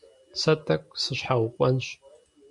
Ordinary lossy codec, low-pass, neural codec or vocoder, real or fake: MP3, 64 kbps; 10.8 kHz; none; real